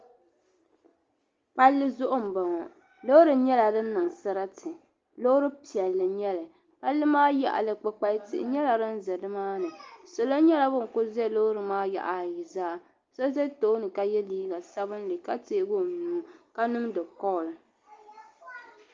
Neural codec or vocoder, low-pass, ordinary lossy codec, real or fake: none; 7.2 kHz; Opus, 32 kbps; real